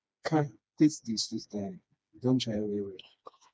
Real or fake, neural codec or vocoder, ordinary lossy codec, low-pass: fake; codec, 16 kHz, 2 kbps, FreqCodec, smaller model; none; none